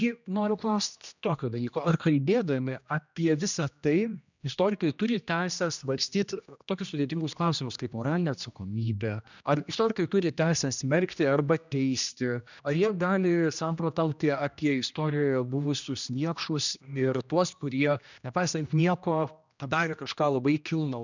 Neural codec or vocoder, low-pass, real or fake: codec, 16 kHz, 1 kbps, X-Codec, HuBERT features, trained on general audio; 7.2 kHz; fake